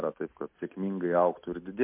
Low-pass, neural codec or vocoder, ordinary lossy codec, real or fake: 3.6 kHz; none; MP3, 32 kbps; real